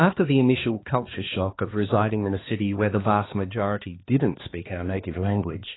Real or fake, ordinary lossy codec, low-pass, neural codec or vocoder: fake; AAC, 16 kbps; 7.2 kHz; codec, 16 kHz, 2 kbps, X-Codec, HuBERT features, trained on balanced general audio